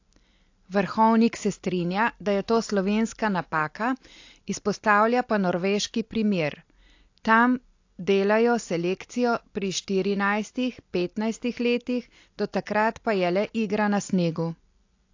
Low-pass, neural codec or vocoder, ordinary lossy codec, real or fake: 7.2 kHz; none; AAC, 48 kbps; real